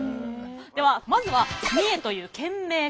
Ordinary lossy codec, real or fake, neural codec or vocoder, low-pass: none; real; none; none